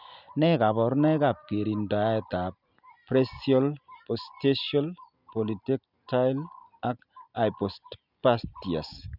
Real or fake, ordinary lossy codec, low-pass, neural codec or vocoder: real; none; 5.4 kHz; none